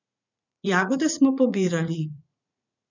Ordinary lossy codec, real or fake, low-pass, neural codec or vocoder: none; fake; 7.2 kHz; vocoder, 44.1 kHz, 80 mel bands, Vocos